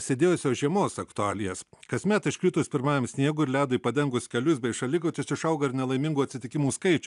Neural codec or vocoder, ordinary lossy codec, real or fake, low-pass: none; AAC, 96 kbps; real; 10.8 kHz